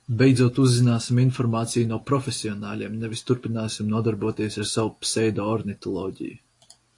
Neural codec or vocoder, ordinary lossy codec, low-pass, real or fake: none; AAC, 48 kbps; 10.8 kHz; real